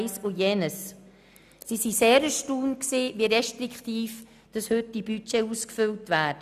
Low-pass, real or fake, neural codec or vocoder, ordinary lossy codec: 14.4 kHz; real; none; none